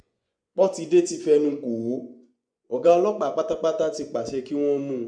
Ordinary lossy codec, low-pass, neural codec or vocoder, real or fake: none; 9.9 kHz; vocoder, 24 kHz, 100 mel bands, Vocos; fake